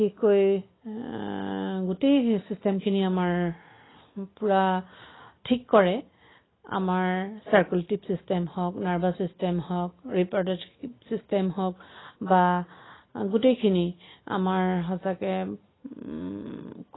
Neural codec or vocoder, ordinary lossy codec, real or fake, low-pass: none; AAC, 16 kbps; real; 7.2 kHz